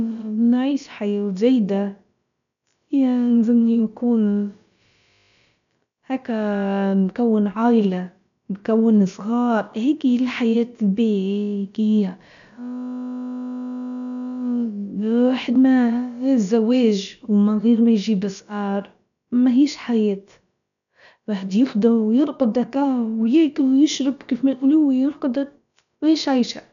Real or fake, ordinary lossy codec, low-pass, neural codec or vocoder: fake; none; 7.2 kHz; codec, 16 kHz, about 1 kbps, DyCAST, with the encoder's durations